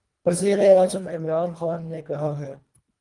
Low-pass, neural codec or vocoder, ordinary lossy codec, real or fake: 10.8 kHz; codec, 24 kHz, 1.5 kbps, HILCodec; Opus, 32 kbps; fake